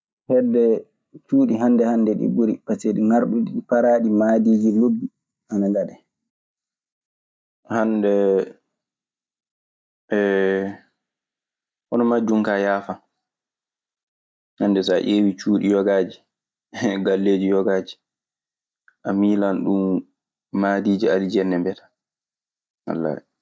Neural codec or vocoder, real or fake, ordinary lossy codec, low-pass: none; real; none; none